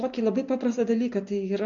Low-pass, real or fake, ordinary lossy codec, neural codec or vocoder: 7.2 kHz; real; MP3, 48 kbps; none